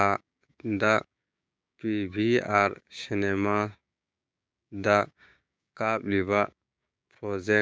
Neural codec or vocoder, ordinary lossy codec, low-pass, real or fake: codec, 16 kHz, 6 kbps, DAC; none; none; fake